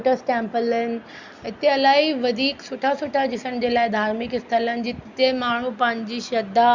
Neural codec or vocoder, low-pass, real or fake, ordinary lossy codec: none; 7.2 kHz; real; Opus, 64 kbps